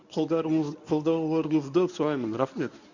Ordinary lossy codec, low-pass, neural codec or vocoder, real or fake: none; 7.2 kHz; codec, 24 kHz, 0.9 kbps, WavTokenizer, medium speech release version 1; fake